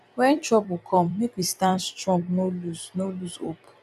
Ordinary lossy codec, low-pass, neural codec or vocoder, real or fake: none; 14.4 kHz; vocoder, 44.1 kHz, 128 mel bands every 256 samples, BigVGAN v2; fake